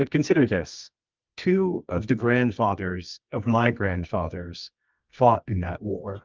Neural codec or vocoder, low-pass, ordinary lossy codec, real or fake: codec, 24 kHz, 0.9 kbps, WavTokenizer, medium music audio release; 7.2 kHz; Opus, 32 kbps; fake